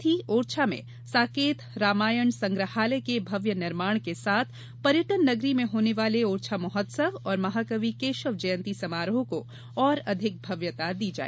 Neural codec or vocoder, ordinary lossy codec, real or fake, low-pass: none; none; real; none